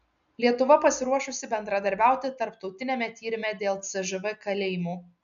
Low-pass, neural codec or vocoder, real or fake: 7.2 kHz; none; real